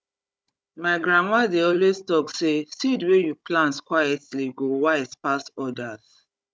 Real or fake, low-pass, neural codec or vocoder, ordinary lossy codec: fake; none; codec, 16 kHz, 16 kbps, FunCodec, trained on Chinese and English, 50 frames a second; none